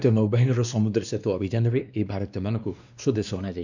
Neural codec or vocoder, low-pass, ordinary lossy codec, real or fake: codec, 16 kHz, 2 kbps, X-Codec, WavLM features, trained on Multilingual LibriSpeech; 7.2 kHz; none; fake